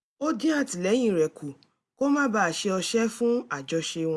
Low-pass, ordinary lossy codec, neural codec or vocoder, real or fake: none; none; none; real